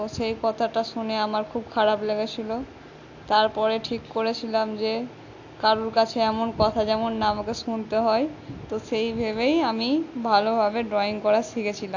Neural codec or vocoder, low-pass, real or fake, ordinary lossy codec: none; 7.2 kHz; real; AAC, 48 kbps